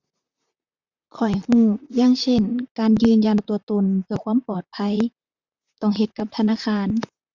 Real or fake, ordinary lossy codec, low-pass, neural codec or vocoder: fake; Opus, 64 kbps; 7.2 kHz; vocoder, 44.1 kHz, 128 mel bands, Pupu-Vocoder